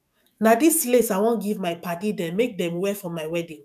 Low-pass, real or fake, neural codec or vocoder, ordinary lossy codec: 14.4 kHz; fake; autoencoder, 48 kHz, 128 numbers a frame, DAC-VAE, trained on Japanese speech; none